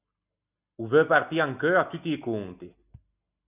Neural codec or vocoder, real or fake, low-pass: none; real; 3.6 kHz